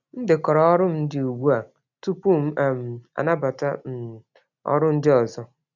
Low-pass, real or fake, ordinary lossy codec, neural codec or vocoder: 7.2 kHz; real; none; none